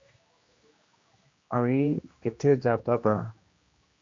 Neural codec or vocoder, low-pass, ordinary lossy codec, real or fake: codec, 16 kHz, 1 kbps, X-Codec, HuBERT features, trained on general audio; 7.2 kHz; MP3, 48 kbps; fake